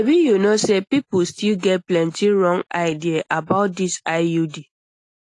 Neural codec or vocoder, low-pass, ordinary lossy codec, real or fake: none; 10.8 kHz; AAC, 48 kbps; real